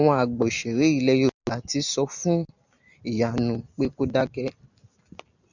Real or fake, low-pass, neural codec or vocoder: real; 7.2 kHz; none